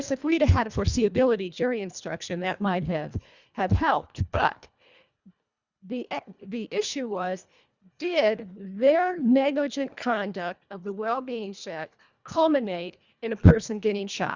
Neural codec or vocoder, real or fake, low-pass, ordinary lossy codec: codec, 24 kHz, 1.5 kbps, HILCodec; fake; 7.2 kHz; Opus, 64 kbps